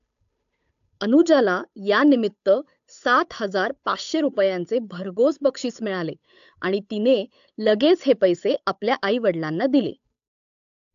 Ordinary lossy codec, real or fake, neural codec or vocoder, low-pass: AAC, 64 kbps; fake; codec, 16 kHz, 8 kbps, FunCodec, trained on Chinese and English, 25 frames a second; 7.2 kHz